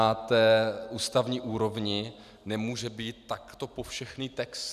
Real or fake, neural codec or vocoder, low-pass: real; none; 14.4 kHz